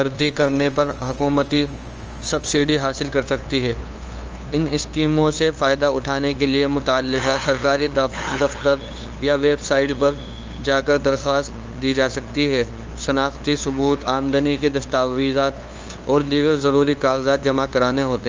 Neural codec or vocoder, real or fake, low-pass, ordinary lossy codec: codec, 16 kHz, 2 kbps, FunCodec, trained on LibriTTS, 25 frames a second; fake; 7.2 kHz; Opus, 24 kbps